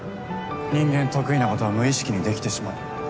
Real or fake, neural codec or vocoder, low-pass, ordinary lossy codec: real; none; none; none